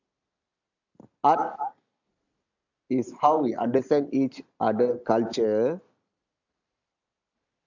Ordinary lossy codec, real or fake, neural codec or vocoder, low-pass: none; real; none; 7.2 kHz